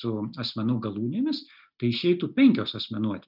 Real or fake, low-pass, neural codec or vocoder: real; 5.4 kHz; none